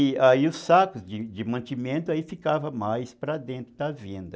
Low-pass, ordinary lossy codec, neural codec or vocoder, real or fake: none; none; none; real